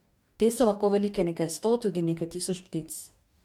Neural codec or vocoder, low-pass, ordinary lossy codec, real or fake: codec, 44.1 kHz, 2.6 kbps, DAC; 19.8 kHz; MP3, 96 kbps; fake